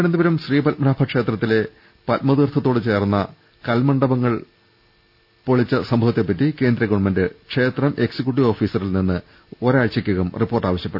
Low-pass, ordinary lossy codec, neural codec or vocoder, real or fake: 5.4 kHz; none; none; real